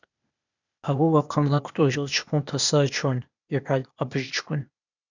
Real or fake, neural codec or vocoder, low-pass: fake; codec, 16 kHz, 0.8 kbps, ZipCodec; 7.2 kHz